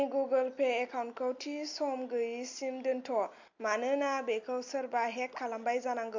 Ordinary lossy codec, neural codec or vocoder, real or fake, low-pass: MP3, 48 kbps; none; real; 7.2 kHz